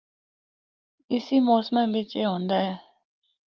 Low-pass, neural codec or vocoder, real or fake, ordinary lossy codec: 7.2 kHz; none; real; Opus, 32 kbps